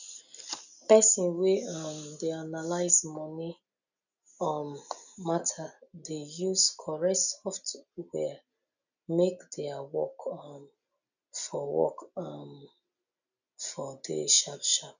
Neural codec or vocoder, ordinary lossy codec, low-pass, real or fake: none; none; 7.2 kHz; real